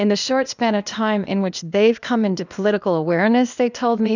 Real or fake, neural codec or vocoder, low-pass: fake; codec, 16 kHz, 0.8 kbps, ZipCodec; 7.2 kHz